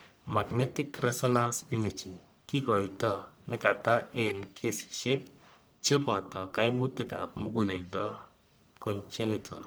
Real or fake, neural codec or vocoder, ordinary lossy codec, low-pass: fake; codec, 44.1 kHz, 1.7 kbps, Pupu-Codec; none; none